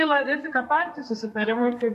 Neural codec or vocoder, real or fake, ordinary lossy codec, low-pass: codec, 32 kHz, 1.9 kbps, SNAC; fake; MP3, 64 kbps; 14.4 kHz